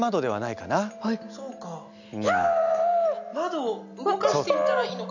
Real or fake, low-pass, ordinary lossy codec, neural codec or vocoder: real; 7.2 kHz; none; none